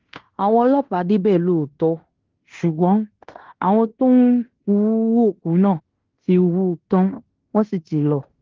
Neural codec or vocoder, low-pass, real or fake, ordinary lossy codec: codec, 16 kHz in and 24 kHz out, 0.9 kbps, LongCat-Audio-Codec, fine tuned four codebook decoder; 7.2 kHz; fake; Opus, 16 kbps